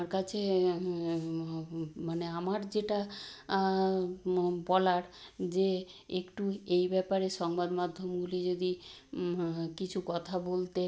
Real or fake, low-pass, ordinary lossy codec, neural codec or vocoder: real; none; none; none